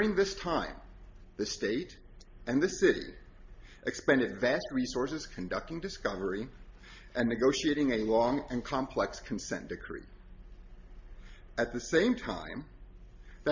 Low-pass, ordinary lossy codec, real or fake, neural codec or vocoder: 7.2 kHz; MP3, 48 kbps; real; none